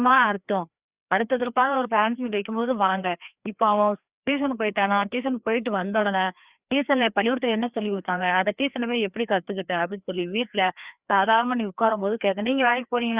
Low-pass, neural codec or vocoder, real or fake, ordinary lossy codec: 3.6 kHz; codec, 16 kHz, 2 kbps, FreqCodec, larger model; fake; Opus, 64 kbps